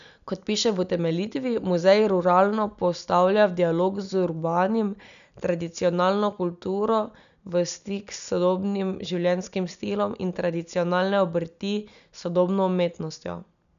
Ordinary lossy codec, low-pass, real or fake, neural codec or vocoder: none; 7.2 kHz; real; none